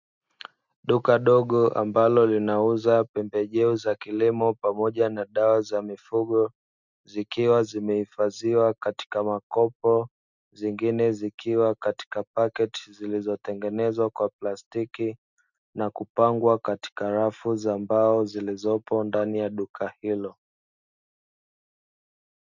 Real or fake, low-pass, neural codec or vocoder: real; 7.2 kHz; none